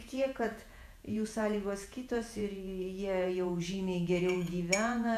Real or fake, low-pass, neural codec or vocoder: fake; 14.4 kHz; vocoder, 48 kHz, 128 mel bands, Vocos